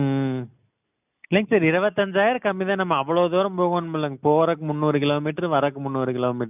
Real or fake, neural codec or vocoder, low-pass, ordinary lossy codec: real; none; 3.6 kHz; none